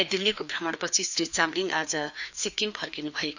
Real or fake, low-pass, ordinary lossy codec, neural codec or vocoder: fake; 7.2 kHz; none; codec, 16 kHz, 2 kbps, FreqCodec, larger model